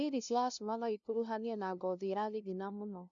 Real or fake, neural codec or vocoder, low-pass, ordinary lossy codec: fake; codec, 16 kHz, 0.5 kbps, FunCodec, trained on LibriTTS, 25 frames a second; 7.2 kHz; none